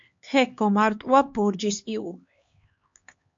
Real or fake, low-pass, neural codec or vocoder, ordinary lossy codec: fake; 7.2 kHz; codec, 16 kHz, 2 kbps, X-Codec, HuBERT features, trained on LibriSpeech; MP3, 48 kbps